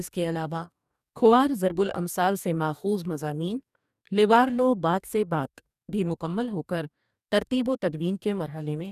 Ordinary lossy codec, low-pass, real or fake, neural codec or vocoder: none; 14.4 kHz; fake; codec, 44.1 kHz, 2.6 kbps, DAC